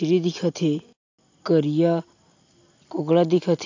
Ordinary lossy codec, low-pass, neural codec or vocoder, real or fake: AAC, 48 kbps; 7.2 kHz; none; real